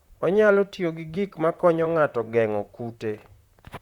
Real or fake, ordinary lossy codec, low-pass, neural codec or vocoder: fake; Opus, 64 kbps; 19.8 kHz; vocoder, 44.1 kHz, 128 mel bands, Pupu-Vocoder